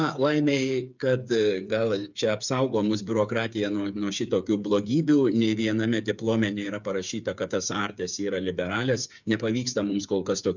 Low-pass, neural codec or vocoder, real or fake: 7.2 kHz; codec, 16 kHz, 8 kbps, FreqCodec, smaller model; fake